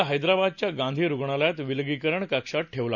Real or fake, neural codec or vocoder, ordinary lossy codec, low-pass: real; none; none; 7.2 kHz